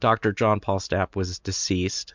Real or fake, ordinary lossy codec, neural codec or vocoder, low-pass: real; MP3, 64 kbps; none; 7.2 kHz